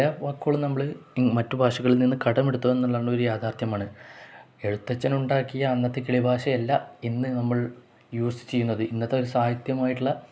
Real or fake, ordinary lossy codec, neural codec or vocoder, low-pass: real; none; none; none